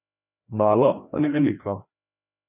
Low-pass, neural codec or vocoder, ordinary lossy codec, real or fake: 3.6 kHz; codec, 16 kHz, 1 kbps, FreqCodec, larger model; none; fake